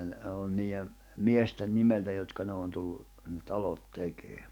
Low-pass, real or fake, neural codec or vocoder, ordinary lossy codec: 19.8 kHz; fake; codec, 44.1 kHz, 7.8 kbps, DAC; none